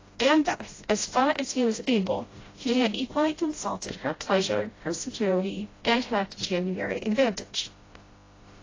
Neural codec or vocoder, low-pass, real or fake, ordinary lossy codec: codec, 16 kHz, 0.5 kbps, FreqCodec, smaller model; 7.2 kHz; fake; AAC, 32 kbps